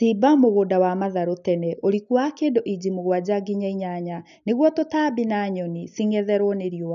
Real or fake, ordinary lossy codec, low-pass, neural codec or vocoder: real; none; 7.2 kHz; none